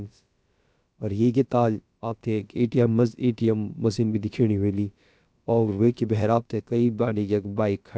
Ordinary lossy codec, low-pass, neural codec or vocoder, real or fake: none; none; codec, 16 kHz, about 1 kbps, DyCAST, with the encoder's durations; fake